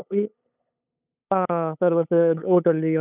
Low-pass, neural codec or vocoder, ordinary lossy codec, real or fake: 3.6 kHz; codec, 16 kHz, 8 kbps, FunCodec, trained on LibriTTS, 25 frames a second; none; fake